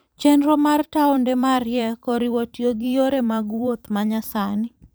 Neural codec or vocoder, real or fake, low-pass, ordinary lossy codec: vocoder, 44.1 kHz, 128 mel bands every 512 samples, BigVGAN v2; fake; none; none